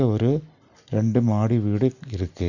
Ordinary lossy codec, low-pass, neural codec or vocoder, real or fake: none; 7.2 kHz; none; real